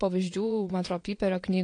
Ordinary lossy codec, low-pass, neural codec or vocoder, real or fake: AAC, 48 kbps; 9.9 kHz; vocoder, 22.05 kHz, 80 mel bands, Vocos; fake